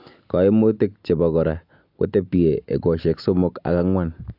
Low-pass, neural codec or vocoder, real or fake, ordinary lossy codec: 5.4 kHz; none; real; AAC, 48 kbps